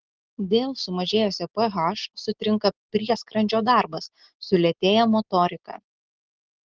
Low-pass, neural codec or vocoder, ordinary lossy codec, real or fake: 7.2 kHz; none; Opus, 16 kbps; real